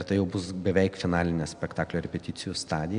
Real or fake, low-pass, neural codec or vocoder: real; 9.9 kHz; none